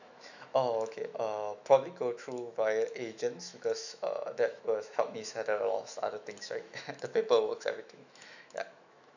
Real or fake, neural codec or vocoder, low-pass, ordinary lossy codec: fake; autoencoder, 48 kHz, 128 numbers a frame, DAC-VAE, trained on Japanese speech; 7.2 kHz; none